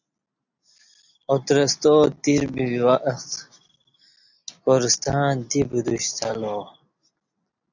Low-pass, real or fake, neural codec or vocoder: 7.2 kHz; real; none